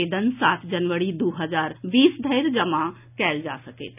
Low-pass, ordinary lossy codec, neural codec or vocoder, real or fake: 3.6 kHz; none; none; real